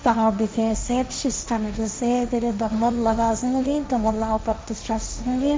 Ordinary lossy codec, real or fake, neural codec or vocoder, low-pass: none; fake; codec, 16 kHz, 1.1 kbps, Voila-Tokenizer; none